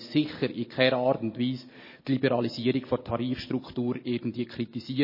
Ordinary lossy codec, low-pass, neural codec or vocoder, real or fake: MP3, 24 kbps; 5.4 kHz; none; real